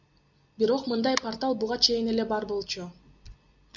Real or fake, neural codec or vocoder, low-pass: real; none; 7.2 kHz